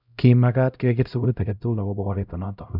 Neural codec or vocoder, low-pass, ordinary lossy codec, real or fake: codec, 16 kHz, 0.5 kbps, X-Codec, HuBERT features, trained on LibriSpeech; 5.4 kHz; none; fake